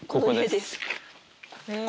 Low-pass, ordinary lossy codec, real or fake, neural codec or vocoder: none; none; real; none